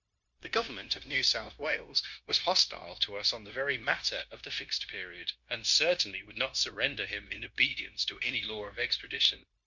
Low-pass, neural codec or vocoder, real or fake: 7.2 kHz; codec, 16 kHz, 0.9 kbps, LongCat-Audio-Codec; fake